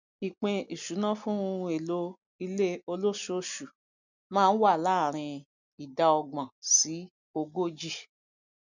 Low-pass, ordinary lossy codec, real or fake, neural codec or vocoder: 7.2 kHz; none; real; none